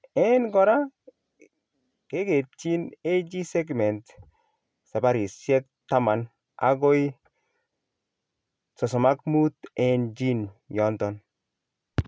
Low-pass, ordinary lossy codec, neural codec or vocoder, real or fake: none; none; none; real